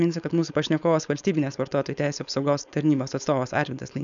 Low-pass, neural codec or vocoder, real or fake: 7.2 kHz; codec, 16 kHz, 4.8 kbps, FACodec; fake